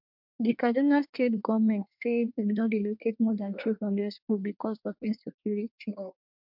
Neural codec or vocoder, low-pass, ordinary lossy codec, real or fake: codec, 24 kHz, 1 kbps, SNAC; 5.4 kHz; MP3, 48 kbps; fake